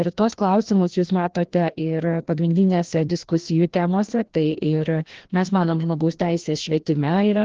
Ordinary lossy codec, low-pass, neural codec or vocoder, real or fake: Opus, 16 kbps; 7.2 kHz; codec, 16 kHz, 1 kbps, FreqCodec, larger model; fake